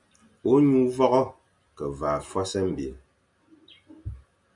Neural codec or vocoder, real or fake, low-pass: none; real; 10.8 kHz